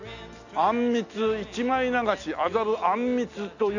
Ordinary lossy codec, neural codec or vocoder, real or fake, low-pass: none; none; real; 7.2 kHz